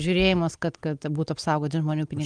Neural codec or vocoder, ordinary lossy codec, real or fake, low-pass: none; Opus, 24 kbps; real; 9.9 kHz